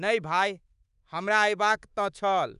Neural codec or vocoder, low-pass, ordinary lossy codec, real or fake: codec, 24 kHz, 3.1 kbps, DualCodec; 10.8 kHz; none; fake